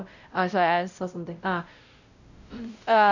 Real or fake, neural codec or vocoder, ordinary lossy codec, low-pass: fake; codec, 16 kHz, 0.5 kbps, X-Codec, WavLM features, trained on Multilingual LibriSpeech; none; 7.2 kHz